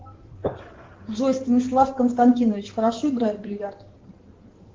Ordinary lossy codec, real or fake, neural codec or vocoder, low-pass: Opus, 16 kbps; fake; codec, 16 kHz in and 24 kHz out, 2.2 kbps, FireRedTTS-2 codec; 7.2 kHz